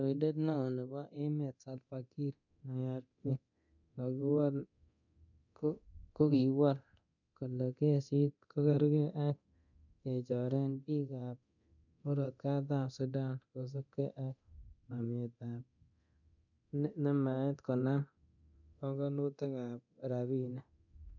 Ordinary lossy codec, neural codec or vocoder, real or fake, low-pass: none; codec, 24 kHz, 0.9 kbps, DualCodec; fake; 7.2 kHz